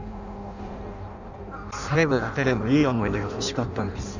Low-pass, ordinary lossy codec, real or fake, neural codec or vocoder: 7.2 kHz; none; fake; codec, 16 kHz in and 24 kHz out, 0.6 kbps, FireRedTTS-2 codec